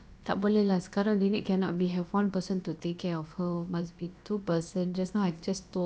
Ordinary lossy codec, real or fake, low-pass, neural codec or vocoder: none; fake; none; codec, 16 kHz, about 1 kbps, DyCAST, with the encoder's durations